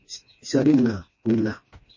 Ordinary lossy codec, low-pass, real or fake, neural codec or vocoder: MP3, 32 kbps; 7.2 kHz; fake; codec, 16 kHz, 2 kbps, FreqCodec, smaller model